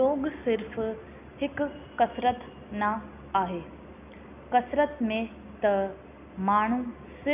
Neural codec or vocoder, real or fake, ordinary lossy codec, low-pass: none; real; none; 3.6 kHz